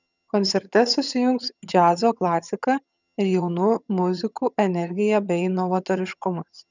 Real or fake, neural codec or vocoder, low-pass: fake; vocoder, 22.05 kHz, 80 mel bands, HiFi-GAN; 7.2 kHz